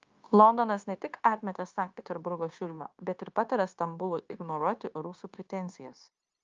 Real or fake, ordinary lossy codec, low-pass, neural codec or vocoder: fake; Opus, 24 kbps; 7.2 kHz; codec, 16 kHz, 0.9 kbps, LongCat-Audio-Codec